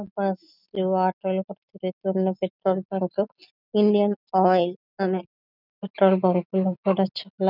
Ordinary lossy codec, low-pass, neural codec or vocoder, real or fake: none; 5.4 kHz; none; real